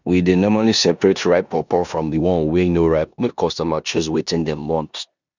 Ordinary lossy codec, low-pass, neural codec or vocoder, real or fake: none; 7.2 kHz; codec, 16 kHz in and 24 kHz out, 0.9 kbps, LongCat-Audio-Codec, four codebook decoder; fake